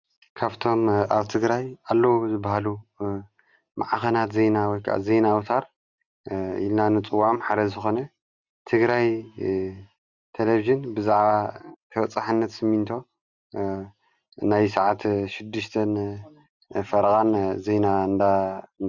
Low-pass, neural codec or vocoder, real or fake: 7.2 kHz; none; real